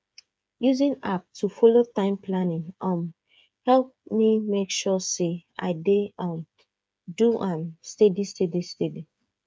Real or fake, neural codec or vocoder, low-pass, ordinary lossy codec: fake; codec, 16 kHz, 8 kbps, FreqCodec, smaller model; none; none